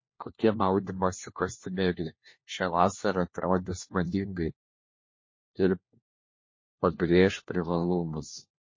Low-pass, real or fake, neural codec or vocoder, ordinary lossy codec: 7.2 kHz; fake; codec, 16 kHz, 1 kbps, FunCodec, trained on LibriTTS, 50 frames a second; MP3, 32 kbps